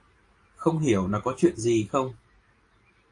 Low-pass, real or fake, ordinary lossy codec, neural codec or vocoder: 10.8 kHz; fake; AAC, 48 kbps; vocoder, 44.1 kHz, 128 mel bands every 512 samples, BigVGAN v2